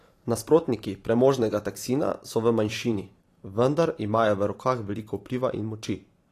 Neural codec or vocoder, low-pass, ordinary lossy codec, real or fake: vocoder, 44.1 kHz, 128 mel bands every 512 samples, BigVGAN v2; 14.4 kHz; AAC, 48 kbps; fake